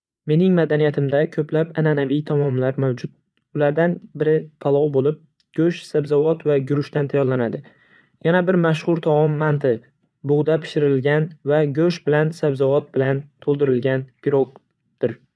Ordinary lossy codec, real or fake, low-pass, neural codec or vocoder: none; fake; none; vocoder, 22.05 kHz, 80 mel bands, Vocos